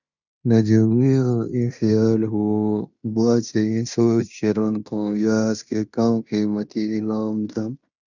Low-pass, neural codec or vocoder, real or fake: 7.2 kHz; codec, 16 kHz in and 24 kHz out, 0.9 kbps, LongCat-Audio-Codec, fine tuned four codebook decoder; fake